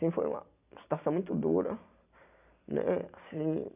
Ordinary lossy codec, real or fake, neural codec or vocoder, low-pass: none; real; none; 3.6 kHz